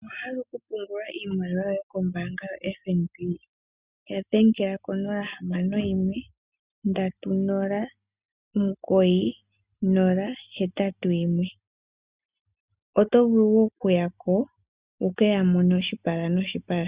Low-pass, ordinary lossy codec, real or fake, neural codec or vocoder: 3.6 kHz; Opus, 64 kbps; real; none